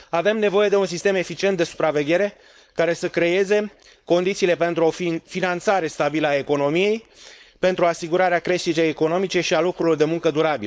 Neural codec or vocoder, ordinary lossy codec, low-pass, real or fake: codec, 16 kHz, 4.8 kbps, FACodec; none; none; fake